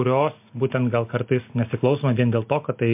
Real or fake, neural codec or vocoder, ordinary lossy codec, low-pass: real; none; MP3, 32 kbps; 3.6 kHz